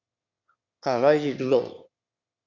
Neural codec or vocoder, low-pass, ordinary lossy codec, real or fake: autoencoder, 22.05 kHz, a latent of 192 numbers a frame, VITS, trained on one speaker; 7.2 kHz; Opus, 64 kbps; fake